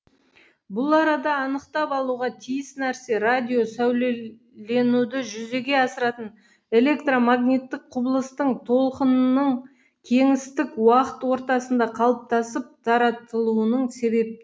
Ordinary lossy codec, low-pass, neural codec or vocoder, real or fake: none; none; none; real